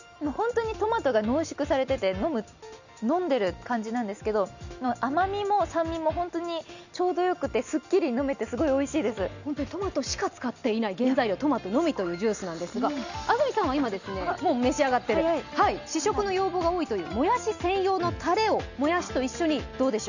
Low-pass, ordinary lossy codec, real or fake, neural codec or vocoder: 7.2 kHz; none; real; none